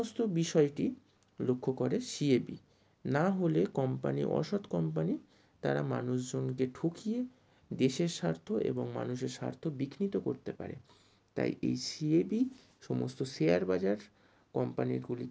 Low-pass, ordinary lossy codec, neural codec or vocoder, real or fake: none; none; none; real